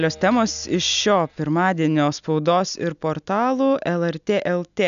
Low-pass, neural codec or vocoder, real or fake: 7.2 kHz; none; real